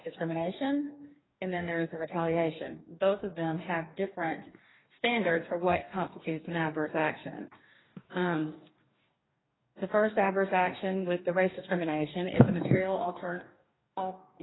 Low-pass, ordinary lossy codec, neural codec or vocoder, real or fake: 7.2 kHz; AAC, 16 kbps; codec, 44.1 kHz, 2.6 kbps, DAC; fake